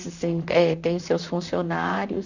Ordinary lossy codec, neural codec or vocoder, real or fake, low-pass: none; vocoder, 44.1 kHz, 128 mel bands, Pupu-Vocoder; fake; 7.2 kHz